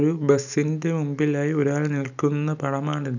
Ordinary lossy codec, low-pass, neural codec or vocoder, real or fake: none; 7.2 kHz; none; real